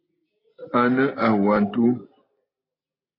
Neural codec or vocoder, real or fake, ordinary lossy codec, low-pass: none; real; AAC, 24 kbps; 5.4 kHz